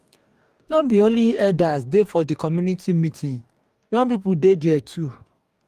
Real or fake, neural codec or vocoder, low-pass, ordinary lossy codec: fake; codec, 44.1 kHz, 2.6 kbps, DAC; 14.4 kHz; Opus, 32 kbps